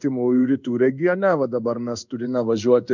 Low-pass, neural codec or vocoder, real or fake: 7.2 kHz; codec, 16 kHz in and 24 kHz out, 1 kbps, XY-Tokenizer; fake